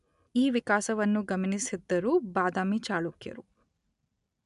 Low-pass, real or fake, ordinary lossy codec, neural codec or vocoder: 10.8 kHz; real; none; none